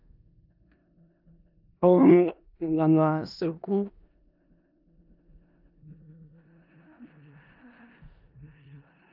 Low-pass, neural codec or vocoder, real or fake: 5.4 kHz; codec, 16 kHz in and 24 kHz out, 0.4 kbps, LongCat-Audio-Codec, four codebook decoder; fake